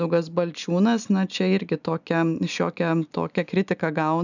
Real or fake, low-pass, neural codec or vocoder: real; 7.2 kHz; none